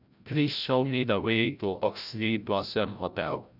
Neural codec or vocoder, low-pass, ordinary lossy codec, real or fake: codec, 16 kHz, 0.5 kbps, FreqCodec, larger model; 5.4 kHz; none; fake